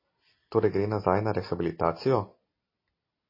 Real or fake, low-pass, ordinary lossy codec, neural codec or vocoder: real; 5.4 kHz; MP3, 24 kbps; none